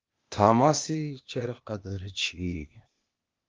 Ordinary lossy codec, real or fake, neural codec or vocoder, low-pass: Opus, 24 kbps; fake; codec, 16 kHz, 0.8 kbps, ZipCodec; 7.2 kHz